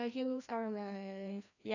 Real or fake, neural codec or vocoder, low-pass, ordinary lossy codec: fake; codec, 16 kHz, 1 kbps, FreqCodec, larger model; 7.2 kHz; none